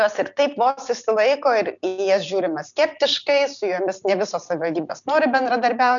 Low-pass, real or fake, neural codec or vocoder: 7.2 kHz; real; none